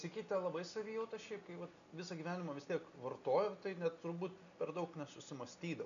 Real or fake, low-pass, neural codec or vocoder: real; 7.2 kHz; none